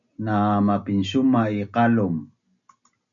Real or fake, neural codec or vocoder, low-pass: real; none; 7.2 kHz